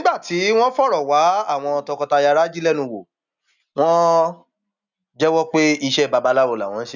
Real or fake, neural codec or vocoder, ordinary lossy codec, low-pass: real; none; none; 7.2 kHz